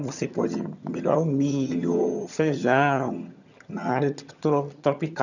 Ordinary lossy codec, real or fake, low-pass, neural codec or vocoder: none; fake; 7.2 kHz; vocoder, 22.05 kHz, 80 mel bands, HiFi-GAN